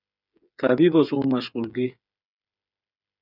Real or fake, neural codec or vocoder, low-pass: fake; codec, 16 kHz, 8 kbps, FreqCodec, smaller model; 5.4 kHz